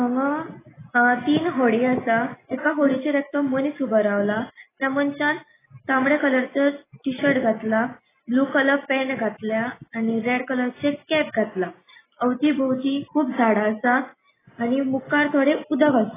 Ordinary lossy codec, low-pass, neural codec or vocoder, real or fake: AAC, 16 kbps; 3.6 kHz; none; real